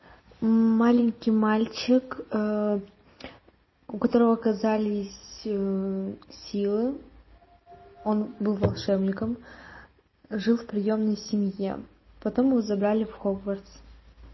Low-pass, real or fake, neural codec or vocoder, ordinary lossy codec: 7.2 kHz; real; none; MP3, 24 kbps